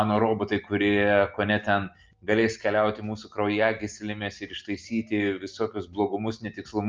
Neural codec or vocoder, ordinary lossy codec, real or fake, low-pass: none; Opus, 24 kbps; real; 7.2 kHz